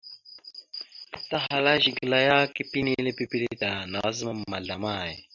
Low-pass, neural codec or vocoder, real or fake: 7.2 kHz; none; real